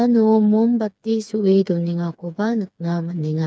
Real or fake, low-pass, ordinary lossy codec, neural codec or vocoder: fake; none; none; codec, 16 kHz, 4 kbps, FreqCodec, smaller model